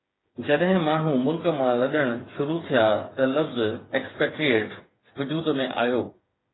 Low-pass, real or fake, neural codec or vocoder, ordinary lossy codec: 7.2 kHz; fake; codec, 16 kHz, 8 kbps, FreqCodec, smaller model; AAC, 16 kbps